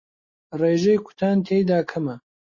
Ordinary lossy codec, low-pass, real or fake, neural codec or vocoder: MP3, 32 kbps; 7.2 kHz; real; none